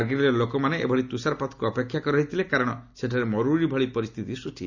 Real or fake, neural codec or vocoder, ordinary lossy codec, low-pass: real; none; none; 7.2 kHz